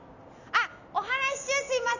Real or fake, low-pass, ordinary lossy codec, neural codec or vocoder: real; 7.2 kHz; none; none